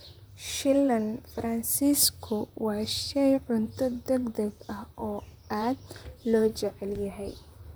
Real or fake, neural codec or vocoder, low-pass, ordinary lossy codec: fake; vocoder, 44.1 kHz, 128 mel bands, Pupu-Vocoder; none; none